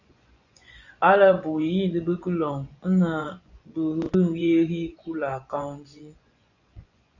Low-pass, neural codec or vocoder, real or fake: 7.2 kHz; vocoder, 24 kHz, 100 mel bands, Vocos; fake